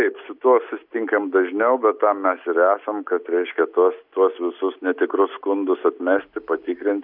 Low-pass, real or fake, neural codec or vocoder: 5.4 kHz; real; none